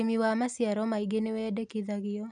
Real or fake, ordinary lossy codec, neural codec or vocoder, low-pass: real; none; none; 9.9 kHz